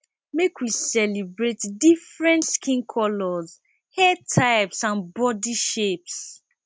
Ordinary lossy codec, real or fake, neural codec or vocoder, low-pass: none; real; none; none